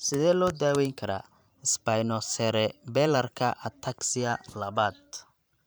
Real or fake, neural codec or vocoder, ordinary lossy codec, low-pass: fake; vocoder, 44.1 kHz, 128 mel bands every 512 samples, BigVGAN v2; none; none